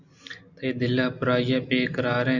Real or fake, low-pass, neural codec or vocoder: real; 7.2 kHz; none